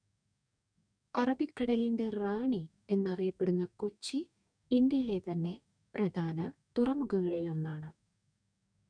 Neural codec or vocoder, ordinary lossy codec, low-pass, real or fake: codec, 44.1 kHz, 2.6 kbps, DAC; none; 9.9 kHz; fake